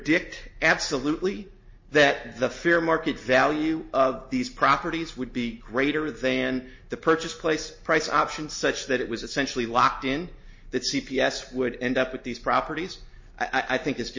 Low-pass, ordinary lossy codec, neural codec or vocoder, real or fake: 7.2 kHz; MP3, 32 kbps; none; real